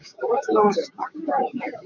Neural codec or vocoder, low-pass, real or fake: codec, 16 kHz, 6 kbps, DAC; 7.2 kHz; fake